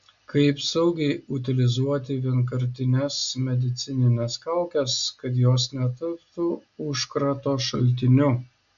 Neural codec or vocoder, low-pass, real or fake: none; 7.2 kHz; real